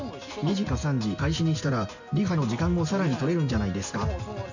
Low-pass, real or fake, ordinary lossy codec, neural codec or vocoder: 7.2 kHz; real; none; none